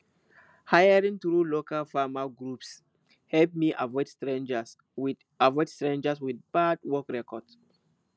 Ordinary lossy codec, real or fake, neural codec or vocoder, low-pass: none; real; none; none